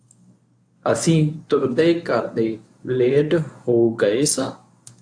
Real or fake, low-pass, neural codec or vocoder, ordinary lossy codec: fake; 9.9 kHz; codec, 24 kHz, 0.9 kbps, WavTokenizer, medium speech release version 1; AAC, 64 kbps